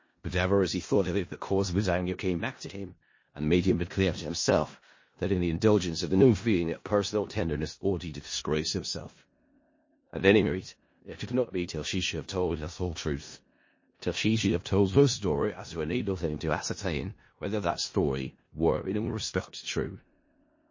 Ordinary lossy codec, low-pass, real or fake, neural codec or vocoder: MP3, 32 kbps; 7.2 kHz; fake; codec, 16 kHz in and 24 kHz out, 0.4 kbps, LongCat-Audio-Codec, four codebook decoder